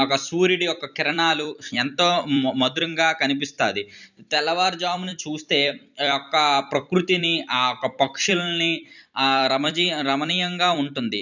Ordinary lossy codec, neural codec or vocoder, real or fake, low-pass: none; vocoder, 44.1 kHz, 128 mel bands every 256 samples, BigVGAN v2; fake; 7.2 kHz